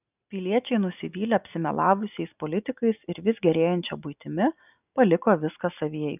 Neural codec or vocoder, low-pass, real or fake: none; 3.6 kHz; real